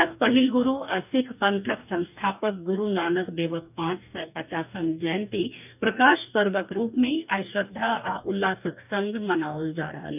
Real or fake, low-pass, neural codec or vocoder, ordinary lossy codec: fake; 3.6 kHz; codec, 44.1 kHz, 2.6 kbps, DAC; none